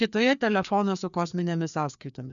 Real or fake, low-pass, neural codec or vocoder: fake; 7.2 kHz; codec, 16 kHz, 2 kbps, FreqCodec, larger model